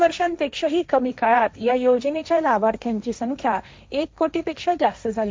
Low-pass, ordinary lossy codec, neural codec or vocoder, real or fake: none; none; codec, 16 kHz, 1.1 kbps, Voila-Tokenizer; fake